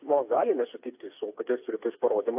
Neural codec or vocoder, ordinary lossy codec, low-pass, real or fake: codec, 16 kHz, 4 kbps, FreqCodec, smaller model; AAC, 32 kbps; 3.6 kHz; fake